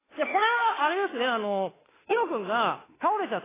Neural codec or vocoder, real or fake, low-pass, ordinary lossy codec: autoencoder, 48 kHz, 32 numbers a frame, DAC-VAE, trained on Japanese speech; fake; 3.6 kHz; AAC, 16 kbps